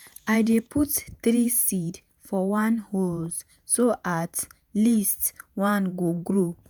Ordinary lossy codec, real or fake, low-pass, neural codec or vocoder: none; fake; none; vocoder, 48 kHz, 128 mel bands, Vocos